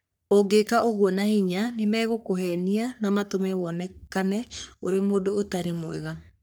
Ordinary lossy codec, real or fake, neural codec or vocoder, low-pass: none; fake; codec, 44.1 kHz, 3.4 kbps, Pupu-Codec; none